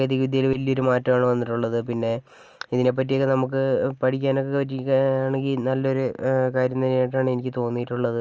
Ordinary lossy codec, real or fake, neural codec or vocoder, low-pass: Opus, 24 kbps; real; none; 7.2 kHz